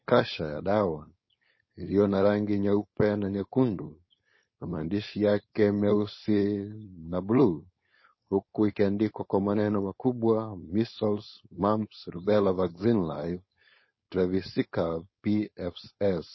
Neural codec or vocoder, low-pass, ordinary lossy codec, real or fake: codec, 16 kHz, 4.8 kbps, FACodec; 7.2 kHz; MP3, 24 kbps; fake